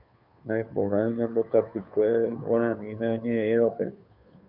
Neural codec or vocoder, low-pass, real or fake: codec, 16 kHz, 2 kbps, FunCodec, trained on Chinese and English, 25 frames a second; 5.4 kHz; fake